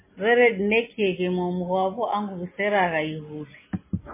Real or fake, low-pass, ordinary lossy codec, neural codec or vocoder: real; 3.6 kHz; MP3, 16 kbps; none